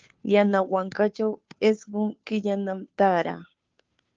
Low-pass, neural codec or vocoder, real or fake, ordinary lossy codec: 7.2 kHz; codec, 16 kHz, 2 kbps, FunCodec, trained on Chinese and English, 25 frames a second; fake; Opus, 24 kbps